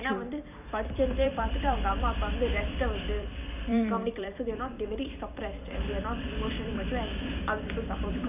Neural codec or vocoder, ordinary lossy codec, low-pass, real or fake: vocoder, 44.1 kHz, 128 mel bands every 256 samples, BigVGAN v2; none; 3.6 kHz; fake